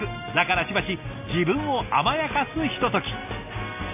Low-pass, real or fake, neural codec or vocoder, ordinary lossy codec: 3.6 kHz; real; none; none